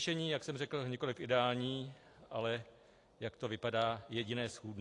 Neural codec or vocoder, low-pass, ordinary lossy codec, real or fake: none; 10.8 kHz; AAC, 48 kbps; real